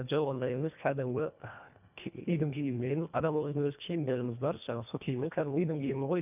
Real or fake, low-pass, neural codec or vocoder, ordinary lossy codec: fake; 3.6 kHz; codec, 24 kHz, 1.5 kbps, HILCodec; none